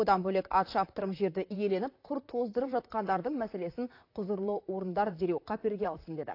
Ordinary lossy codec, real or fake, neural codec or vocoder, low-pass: AAC, 32 kbps; fake; vocoder, 44.1 kHz, 128 mel bands, Pupu-Vocoder; 5.4 kHz